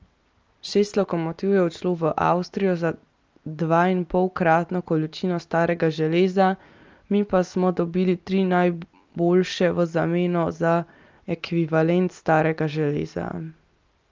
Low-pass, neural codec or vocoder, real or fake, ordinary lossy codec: 7.2 kHz; none; real; Opus, 24 kbps